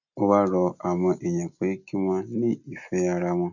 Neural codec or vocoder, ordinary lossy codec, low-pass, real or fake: none; none; 7.2 kHz; real